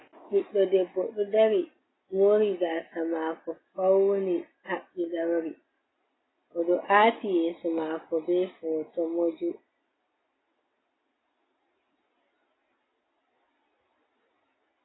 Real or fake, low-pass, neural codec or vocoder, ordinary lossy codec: real; 7.2 kHz; none; AAC, 16 kbps